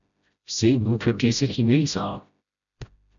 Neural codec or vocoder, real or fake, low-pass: codec, 16 kHz, 0.5 kbps, FreqCodec, smaller model; fake; 7.2 kHz